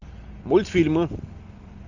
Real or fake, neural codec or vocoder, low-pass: real; none; 7.2 kHz